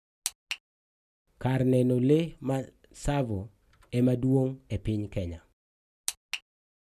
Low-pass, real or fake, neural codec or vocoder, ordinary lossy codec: 14.4 kHz; real; none; none